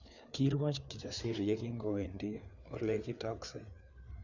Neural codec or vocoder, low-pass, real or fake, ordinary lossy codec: codec, 16 kHz, 4 kbps, FreqCodec, larger model; 7.2 kHz; fake; none